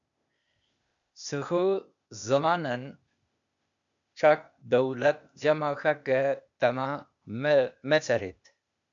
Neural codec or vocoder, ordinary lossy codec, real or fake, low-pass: codec, 16 kHz, 0.8 kbps, ZipCodec; MP3, 96 kbps; fake; 7.2 kHz